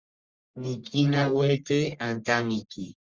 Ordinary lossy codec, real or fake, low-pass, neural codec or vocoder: Opus, 32 kbps; fake; 7.2 kHz; codec, 44.1 kHz, 1.7 kbps, Pupu-Codec